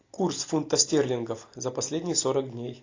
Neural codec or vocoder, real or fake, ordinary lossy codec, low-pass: none; real; AAC, 48 kbps; 7.2 kHz